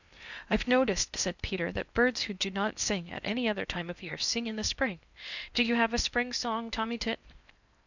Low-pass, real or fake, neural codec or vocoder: 7.2 kHz; fake; codec, 16 kHz in and 24 kHz out, 0.8 kbps, FocalCodec, streaming, 65536 codes